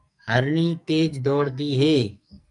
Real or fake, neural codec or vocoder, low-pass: fake; codec, 44.1 kHz, 2.6 kbps, SNAC; 10.8 kHz